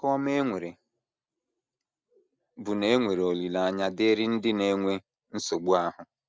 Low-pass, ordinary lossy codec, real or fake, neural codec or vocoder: none; none; real; none